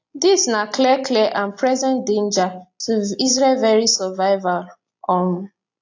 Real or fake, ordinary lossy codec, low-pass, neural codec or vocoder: real; AAC, 48 kbps; 7.2 kHz; none